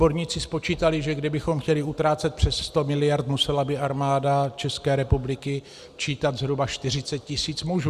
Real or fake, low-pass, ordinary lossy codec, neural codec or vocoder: real; 14.4 kHz; Opus, 64 kbps; none